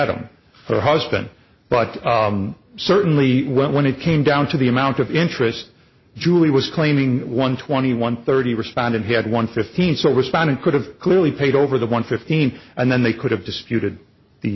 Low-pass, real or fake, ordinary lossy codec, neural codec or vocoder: 7.2 kHz; real; MP3, 24 kbps; none